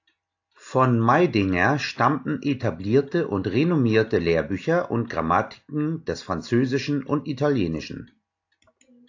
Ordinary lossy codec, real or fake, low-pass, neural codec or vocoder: AAC, 48 kbps; real; 7.2 kHz; none